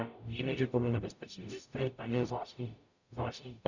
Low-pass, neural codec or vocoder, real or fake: 7.2 kHz; codec, 44.1 kHz, 0.9 kbps, DAC; fake